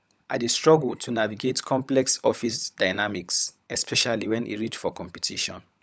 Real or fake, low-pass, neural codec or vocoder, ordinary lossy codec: fake; none; codec, 16 kHz, 16 kbps, FunCodec, trained on LibriTTS, 50 frames a second; none